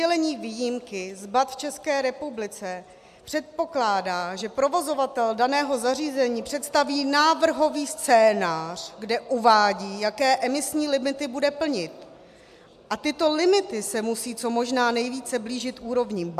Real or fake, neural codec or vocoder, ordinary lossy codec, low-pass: real; none; AAC, 96 kbps; 14.4 kHz